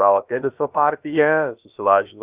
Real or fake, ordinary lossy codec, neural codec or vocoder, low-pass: fake; Opus, 64 kbps; codec, 16 kHz, about 1 kbps, DyCAST, with the encoder's durations; 3.6 kHz